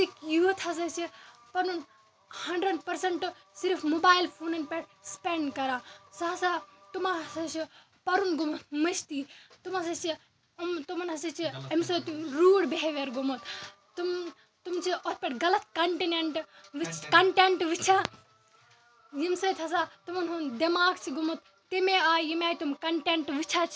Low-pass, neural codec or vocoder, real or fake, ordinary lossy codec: none; none; real; none